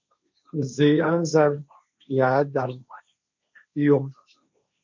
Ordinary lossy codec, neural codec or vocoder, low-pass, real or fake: MP3, 64 kbps; codec, 16 kHz, 1.1 kbps, Voila-Tokenizer; 7.2 kHz; fake